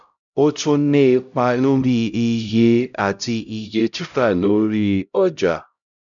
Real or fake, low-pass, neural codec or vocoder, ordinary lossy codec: fake; 7.2 kHz; codec, 16 kHz, 0.5 kbps, X-Codec, HuBERT features, trained on LibriSpeech; none